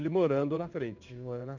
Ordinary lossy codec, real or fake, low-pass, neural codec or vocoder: none; fake; 7.2 kHz; codec, 16 kHz in and 24 kHz out, 1 kbps, XY-Tokenizer